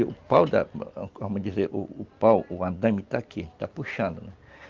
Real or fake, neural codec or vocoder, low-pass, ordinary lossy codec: real; none; 7.2 kHz; Opus, 32 kbps